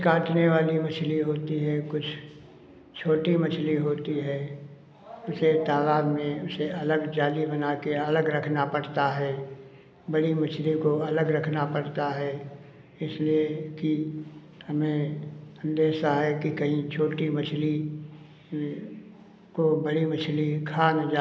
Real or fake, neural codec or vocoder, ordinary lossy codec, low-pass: real; none; none; none